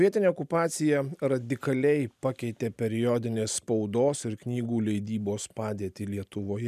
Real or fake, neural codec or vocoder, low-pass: real; none; 14.4 kHz